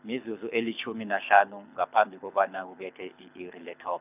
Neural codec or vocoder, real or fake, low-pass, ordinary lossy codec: codec, 24 kHz, 6 kbps, HILCodec; fake; 3.6 kHz; none